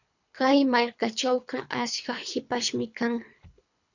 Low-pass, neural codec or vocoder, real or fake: 7.2 kHz; codec, 24 kHz, 3 kbps, HILCodec; fake